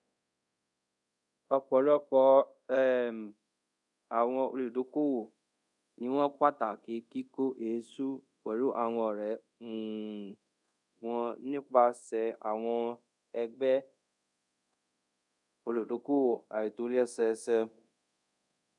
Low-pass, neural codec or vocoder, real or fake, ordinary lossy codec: 10.8 kHz; codec, 24 kHz, 0.5 kbps, DualCodec; fake; none